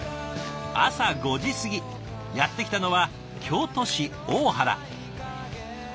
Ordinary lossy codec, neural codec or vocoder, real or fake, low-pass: none; none; real; none